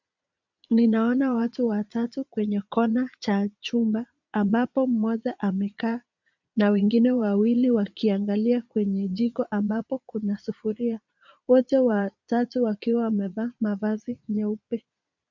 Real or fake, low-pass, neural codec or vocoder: fake; 7.2 kHz; vocoder, 22.05 kHz, 80 mel bands, Vocos